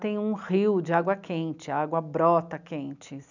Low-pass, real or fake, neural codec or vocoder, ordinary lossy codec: 7.2 kHz; real; none; none